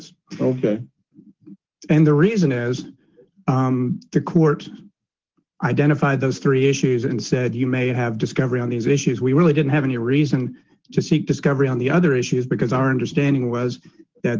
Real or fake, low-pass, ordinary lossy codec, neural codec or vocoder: real; 7.2 kHz; Opus, 16 kbps; none